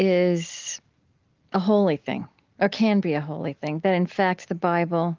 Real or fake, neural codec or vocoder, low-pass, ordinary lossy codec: real; none; 7.2 kHz; Opus, 32 kbps